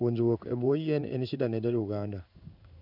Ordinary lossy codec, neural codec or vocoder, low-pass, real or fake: none; codec, 16 kHz in and 24 kHz out, 1 kbps, XY-Tokenizer; 5.4 kHz; fake